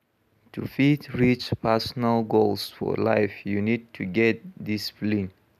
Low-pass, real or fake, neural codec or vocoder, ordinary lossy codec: 14.4 kHz; real; none; none